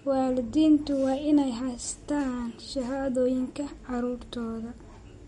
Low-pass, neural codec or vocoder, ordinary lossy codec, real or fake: 19.8 kHz; none; MP3, 48 kbps; real